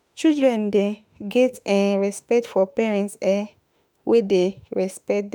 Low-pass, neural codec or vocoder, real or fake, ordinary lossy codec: none; autoencoder, 48 kHz, 32 numbers a frame, DAC-VAE, trained on Japanese speech; fake; none